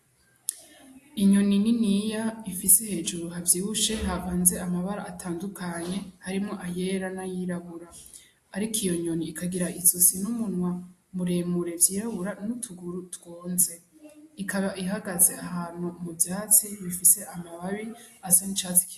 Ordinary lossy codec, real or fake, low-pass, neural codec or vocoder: AAC, 64 kbps; real; 14.4 kHz; none